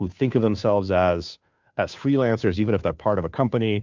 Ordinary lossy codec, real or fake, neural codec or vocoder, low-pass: MP3, 64 kbps; fake; codec, 16 kHz, 2 kbps, FunCodec, trained on Chinese and English, 25 frames a second; 7.2 kHz